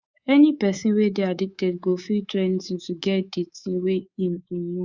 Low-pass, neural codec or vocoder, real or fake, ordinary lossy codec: 7.2 kHz; codec, 24 kHz, 3.1 kbps, DualCodec; fake; Opus, 64 kbps